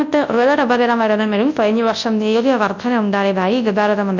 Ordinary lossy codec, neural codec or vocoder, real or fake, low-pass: none; codec, 24 kHz, 0.9 kbps, WavTokenizer, large speech release; fake; 7.2 kHz